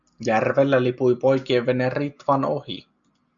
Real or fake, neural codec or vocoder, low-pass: real; none; 7.2 kHz